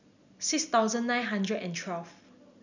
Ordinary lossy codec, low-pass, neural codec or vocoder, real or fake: none; 7.2 kHz; none; real